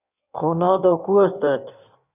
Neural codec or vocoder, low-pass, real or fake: codec, 16 kHz in and 24 kHz out, 1.1 kbps, FireRedTTS-2 codec; 3.6 kHz; fake